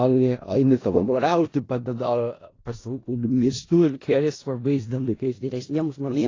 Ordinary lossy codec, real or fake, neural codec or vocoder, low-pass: AAC, 32 kbps; fake; codec, 16 kHz in and 24 kHz out, 0.4 kbps, LongCat-Audio-Codec, four codebook decoder; 7.2 kHz